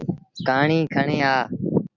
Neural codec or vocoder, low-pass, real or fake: none; 7.2 kHz; real